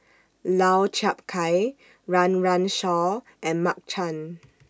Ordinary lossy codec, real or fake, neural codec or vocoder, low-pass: none; real; none; none